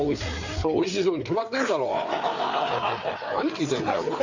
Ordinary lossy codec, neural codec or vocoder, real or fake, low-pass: Opus, 64 kbps; codec, 16 kHz, 4 kbps, FreqCodec, larger model; fake; 7.2 kHz